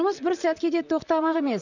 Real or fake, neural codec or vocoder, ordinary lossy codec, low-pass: fake; vocoder, 44.1 kHz, 128 mel bands, Pupu-Vocoder; none; 7.2 kHz